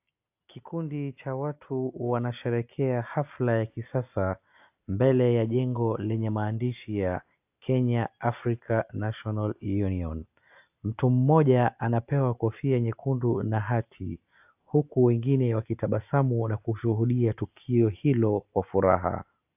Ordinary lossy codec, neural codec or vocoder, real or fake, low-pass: AAC, 32 kbps; none; real; 3.6 kHz